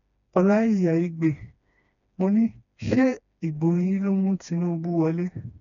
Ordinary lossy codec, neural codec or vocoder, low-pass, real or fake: none; codec, 16 kHz, 2 kbps, FreqCodec, smaller model; 7.2 kHz; fake